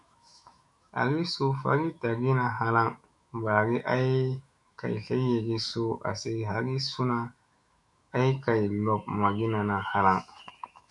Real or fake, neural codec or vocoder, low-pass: fake; autoencoder, 48 kHz, 128 numbers a frame, DAC-VAE, trained on Japanese speech; 10.8 kHz